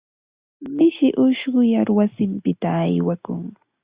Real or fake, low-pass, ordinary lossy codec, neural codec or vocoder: real; 3.6 kHz; Opus, 64 kbps; none